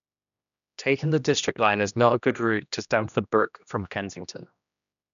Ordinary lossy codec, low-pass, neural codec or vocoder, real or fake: none; 7.2 kHz; codec, 16 kHz, 1 kbps, X-Codec, HuBERT features, trained on general audio; fake